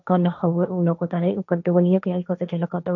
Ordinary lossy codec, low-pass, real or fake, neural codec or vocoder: none; 7.2 kHz; fake; codec, 16 kHz, 1.1 kbps, Voila-Tokenizer